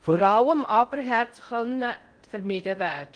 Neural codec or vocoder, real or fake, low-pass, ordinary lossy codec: codec, 16 kHz in and 24 kHz out, 0.6 kbps, FocalCodec, streaming, 4096 codes; fake; 9.9 kHz; Opus, 24 kbps